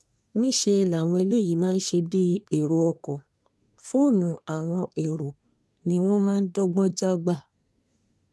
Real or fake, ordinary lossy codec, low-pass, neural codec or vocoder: fake; none; none; codec, 24 kHz, 1 kbps, SNAC